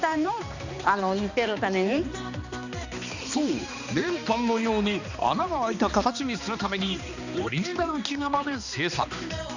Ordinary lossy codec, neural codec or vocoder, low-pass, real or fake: none; codec, 16 kHz, 2 kbps, X-Codec, HuBERT features, trained on balanced general audio; 7.2 kHz; fake